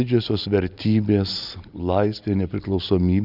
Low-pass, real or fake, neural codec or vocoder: 5.4 kHz; real; none